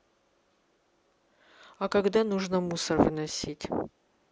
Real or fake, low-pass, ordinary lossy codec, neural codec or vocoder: real; none; none; none